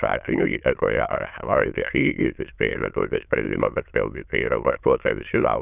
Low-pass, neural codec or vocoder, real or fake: 3.6 kHz; autoencoder, 22.05 kHz, a latent of 192 numbers a frame, VITS, trained on many speakers; fake